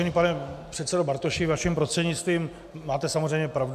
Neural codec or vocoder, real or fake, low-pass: none; real; 14.4 kHz